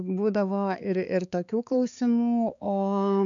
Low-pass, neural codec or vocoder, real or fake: 7.2 kHz; codec, 16 kHz, 4 kbps, X-Codec, HuBERT features, trained on balanced general audio; fake